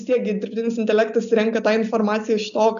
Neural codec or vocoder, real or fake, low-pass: none; real; 7.2 kHz